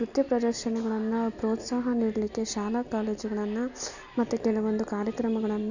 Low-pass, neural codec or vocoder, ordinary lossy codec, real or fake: 7.2 kHz; none; none; real